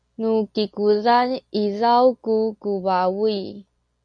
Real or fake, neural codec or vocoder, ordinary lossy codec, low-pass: real; none; MP3, 48 kbps; 9.9 kHz